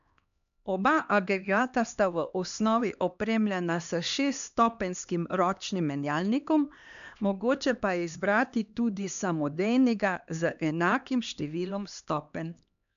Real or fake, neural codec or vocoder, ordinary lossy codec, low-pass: fake; codec, 16 kHz, 2 kbps, X-Codec, HuBERT features, trained on LibriSpeech; none; 7.2 kHz